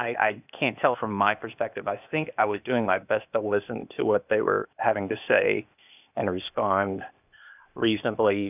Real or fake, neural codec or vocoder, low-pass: fake; codec, 16 kHz, 0.8 kbps, ZipCodec; 3.6 kHz